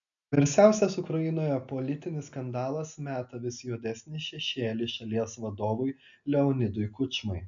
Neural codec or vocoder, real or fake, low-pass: none; real; 7.2 kHz